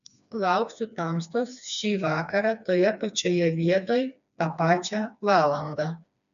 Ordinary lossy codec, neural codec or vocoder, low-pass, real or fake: AAC, 96 kbps; codec, 16 kHz, 2 kbps, FreqCodec, smaller model; 7.2 kHz; fake